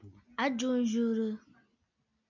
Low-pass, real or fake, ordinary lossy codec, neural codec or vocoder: 7.2 kHz; real; MP3, 64 kbps; none